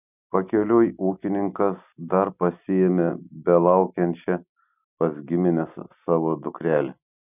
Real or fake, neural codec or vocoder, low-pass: real; none; 3.6 kHz